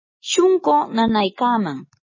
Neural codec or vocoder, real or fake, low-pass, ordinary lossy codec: none; real; 7.2 kHz; MP3, 32 kbps